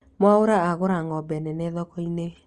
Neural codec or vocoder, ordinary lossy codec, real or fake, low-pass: none; Opus, 24 kbps; real; 10.8 kHz